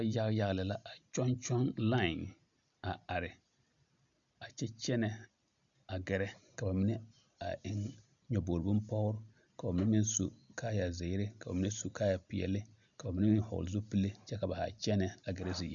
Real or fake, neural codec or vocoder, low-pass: real; none; 7.2 kHz